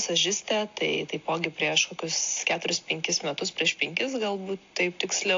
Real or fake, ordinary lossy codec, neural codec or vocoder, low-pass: real; AAC, 48 kbps; none; 7.2 kHz